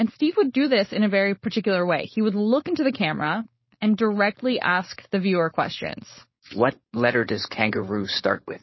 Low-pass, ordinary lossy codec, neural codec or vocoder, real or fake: 7.2 kHz; MP3, 24 kbps; codec, 16 kHz, 4.8 kbps, FACodec; fake